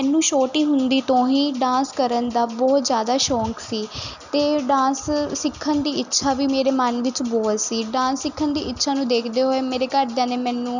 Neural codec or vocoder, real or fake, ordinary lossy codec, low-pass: none; real; none; 7.2 kHz